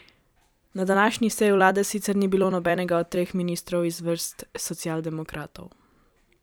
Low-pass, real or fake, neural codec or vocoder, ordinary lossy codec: none; fake; vocoder, 44.1 kHz, 128 mel bands every 256 samples, BigVGAN v2; none